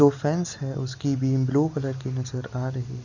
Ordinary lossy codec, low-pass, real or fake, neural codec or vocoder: none; 7.2 kHz; real; none